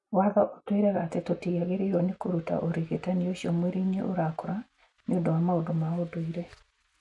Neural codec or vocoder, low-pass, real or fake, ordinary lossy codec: none; 10.8 kHz; real; none